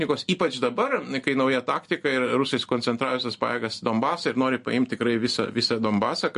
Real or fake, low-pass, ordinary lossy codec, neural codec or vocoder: real; 10.8 kHz; MP3, 48 kbps; none